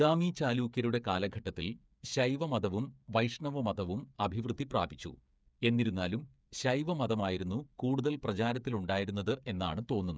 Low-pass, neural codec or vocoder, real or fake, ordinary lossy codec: none; codec, 16 kHz, 8 kbps, FreqCodec, smaller model; fake; none